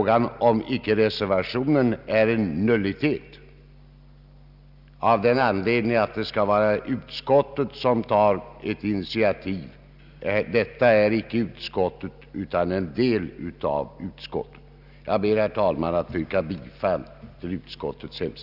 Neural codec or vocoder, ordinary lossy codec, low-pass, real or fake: none; none; 5.4 kHz; real